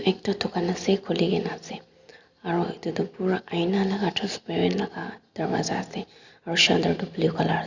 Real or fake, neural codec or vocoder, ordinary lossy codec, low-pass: real; none; Opus, 64 kbps; 7.2 kHz